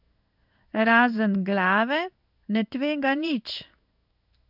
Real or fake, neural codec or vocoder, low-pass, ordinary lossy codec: fake; codec, 16 kHz in and 24 kHz out, 1 kbps, XY-Tokenizer; 5.4 kHz; none